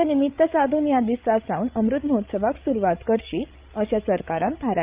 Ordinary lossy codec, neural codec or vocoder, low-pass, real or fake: Opus, 32 kbps; codec, 16 kHz, 16 kbps, FreqCodec, larger model; 3.6 kHz; fake